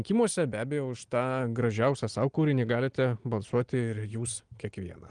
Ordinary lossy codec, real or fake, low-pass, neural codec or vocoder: Opus, 24 kbps; real; 9.9 kHz; none